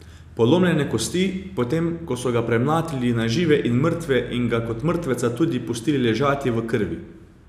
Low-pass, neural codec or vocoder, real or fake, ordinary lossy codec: 14.4 kHz; none; real; none